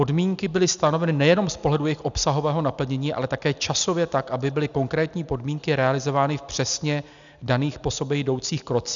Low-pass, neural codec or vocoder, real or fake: 7.2 kHz; none; real